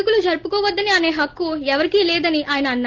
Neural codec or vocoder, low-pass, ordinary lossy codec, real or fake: none; 7.2 kHz; Opus, 16 kbps; real